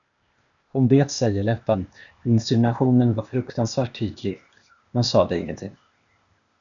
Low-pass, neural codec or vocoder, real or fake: 7.2 kHz; codec, 16 kHz, 0.8 kbps, ZipCodec; fake